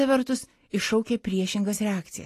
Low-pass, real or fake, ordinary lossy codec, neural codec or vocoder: 14.4 kHz; real; AAC, 48 kbps; none